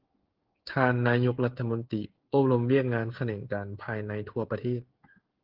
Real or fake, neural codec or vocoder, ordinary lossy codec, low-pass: fake; codec, 16 kHz, 16 kbps, FreqCodec, smaller model; Opus, 16 kbps; 5.4 kHz